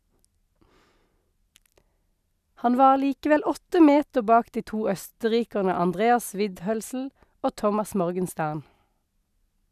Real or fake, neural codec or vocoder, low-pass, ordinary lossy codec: real; none; 14.4 kHz; none